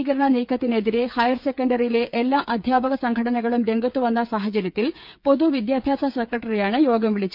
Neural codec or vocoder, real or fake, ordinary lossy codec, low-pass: codec, 16 kHz, 8 kbps, FreqCodec, smaller model; fake; none; 5.4 kHz